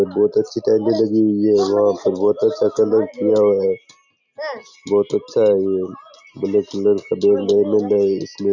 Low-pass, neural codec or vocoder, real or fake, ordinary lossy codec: 7.2 kHz; none; real; none